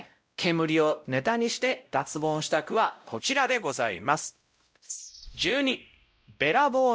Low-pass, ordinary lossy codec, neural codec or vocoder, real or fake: none; none; codec, 16 kHz, 0.5 kbps, X-Codec, WavLM features, trained on Multilingual LibriSpeech; fake